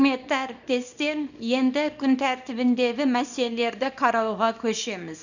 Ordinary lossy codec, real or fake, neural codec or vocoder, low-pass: none; fake; codec, 24 kHz, 0.9 kbps, WavTokenizer, small release; 7.2 kHz